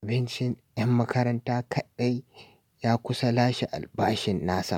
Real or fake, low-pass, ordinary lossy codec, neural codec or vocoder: real; 19.8 kHz; MP3, 96 kbps; none